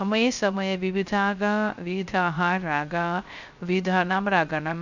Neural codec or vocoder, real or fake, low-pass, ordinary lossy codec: codec, 16 kHz, 0.3 kbps, FocalCodec; fake; 7.2 kHz; none